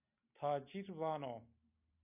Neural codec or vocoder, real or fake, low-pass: none; real; 3.6 kHz